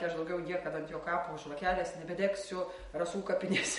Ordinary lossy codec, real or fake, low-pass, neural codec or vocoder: MP3, 48 kbps; real; 19.8 kHz; none